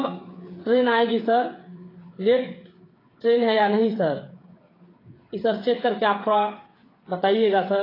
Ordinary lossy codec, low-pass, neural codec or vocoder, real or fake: AAC, 24 kbps; 5.4 kHz; codec, 16 kHz, 8 kbps, FreqCodec, smaller model; fake